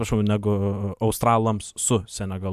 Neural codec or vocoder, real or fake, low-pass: none; real; 14.4 kHz